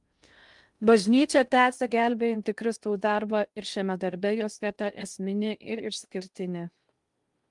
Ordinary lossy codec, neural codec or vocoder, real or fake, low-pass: Opus, 32 kbps; codec, 16 kHz in and 24 kHz out, 0.6 kbps, FocalCodec, streaming, 2048 codes; fake; 10.8 kHz